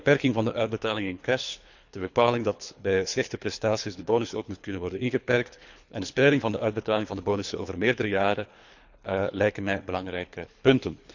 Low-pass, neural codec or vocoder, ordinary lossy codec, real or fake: 7.2 kHz; codec, 24 kHz, 3 kbps, HILCodec; none; fake